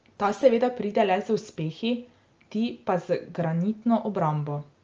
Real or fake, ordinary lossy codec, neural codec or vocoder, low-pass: real; Opus, 24 kbps; none; 7.2 kHz